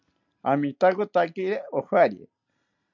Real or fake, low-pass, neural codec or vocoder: real; 7.2 kHz; none